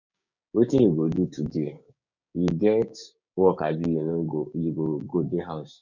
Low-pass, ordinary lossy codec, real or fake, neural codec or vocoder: 7.2 kHz; none; fake; codec, 16 kHz, 6 kbps, DAC